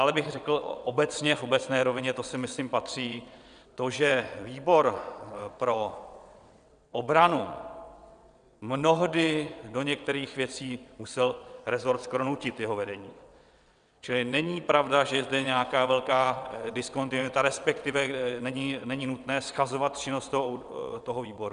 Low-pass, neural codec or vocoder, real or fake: 9.9 kHz; vocoder, 22.05 kHz, 80 mel bands, WaveNeXt; fake